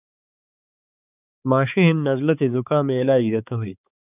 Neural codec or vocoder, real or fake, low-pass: codec, 16 kHz, 4 kbps, X-Codec, HuBERT features, trained on balanced general audio; fake; 3.6 kHz